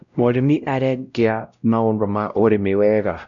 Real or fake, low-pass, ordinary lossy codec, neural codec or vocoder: fake; 7.2 kHz; none; codec, 16 kHz, 0.5 kbps, X-Codec, WavLM features, trained on Multilingual LibriSpeech